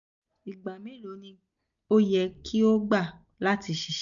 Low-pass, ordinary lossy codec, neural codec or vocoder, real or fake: 7.2 kHz; none; none; real